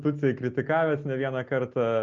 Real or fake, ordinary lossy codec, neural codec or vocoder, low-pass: real; Opus, 24 kbps; none; 7.2 kHz